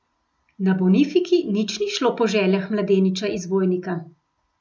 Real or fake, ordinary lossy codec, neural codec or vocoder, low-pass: real; none; none; none